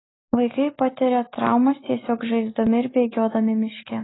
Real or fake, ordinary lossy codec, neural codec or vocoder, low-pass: real; AAC, 16 kbps; none; 7.2 kHz